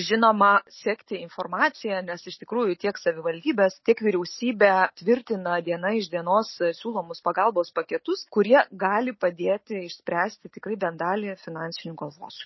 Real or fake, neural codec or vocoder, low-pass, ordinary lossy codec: real; none; 7.2 kHz; MP3, 24 kbps